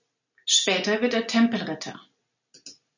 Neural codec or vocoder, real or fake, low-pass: none; real; 7.2 kHz